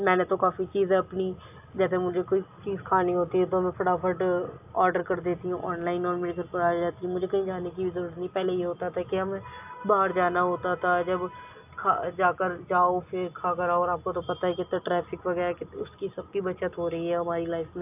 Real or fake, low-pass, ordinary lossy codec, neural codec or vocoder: real; 3.6 kHz; AAC, 32 kbps; none